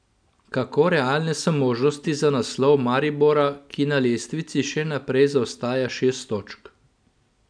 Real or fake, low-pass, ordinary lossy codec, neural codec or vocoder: real; 9.9 kHz; none; none